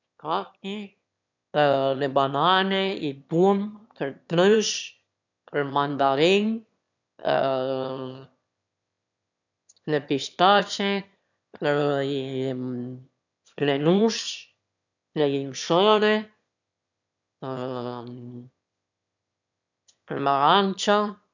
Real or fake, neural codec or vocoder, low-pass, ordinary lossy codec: fake; autoencoder, 22.05 kHz, a latent of 192 numbers a frame, VITS, trained on one speaker; 7.2 kHz; none